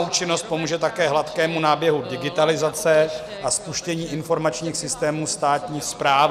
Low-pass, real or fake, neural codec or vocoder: 14.4 kHz; real; none